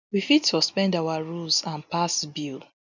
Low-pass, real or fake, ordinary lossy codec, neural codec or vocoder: 7.2 kHz; real; none; none